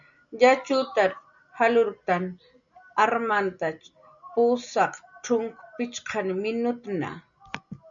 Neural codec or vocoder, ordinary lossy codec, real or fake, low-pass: none; MP3, 96 kbps; real; 7.2 kHz